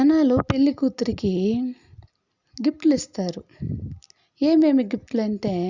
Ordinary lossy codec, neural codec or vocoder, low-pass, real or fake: Opus, 64 kbps; none; 7.2 kHz; real